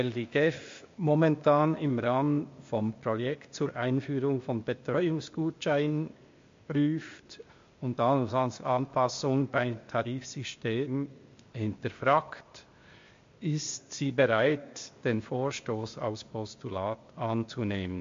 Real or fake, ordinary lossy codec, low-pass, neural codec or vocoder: fake; MP3, 48 kbps; 7.2 kHz; codec, 16 kHz, 0.8 kbps, ZipCodec